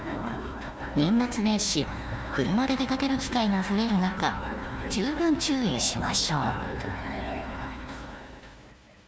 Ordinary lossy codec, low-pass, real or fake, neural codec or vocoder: none; none; fake; codec, 16 kHz, 1 kbps, FunCodec, trained on Chinese and English, 50 frames a second